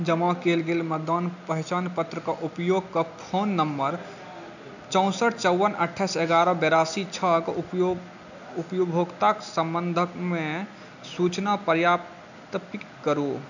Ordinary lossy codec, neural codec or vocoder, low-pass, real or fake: none; none; 7.2 kHz; real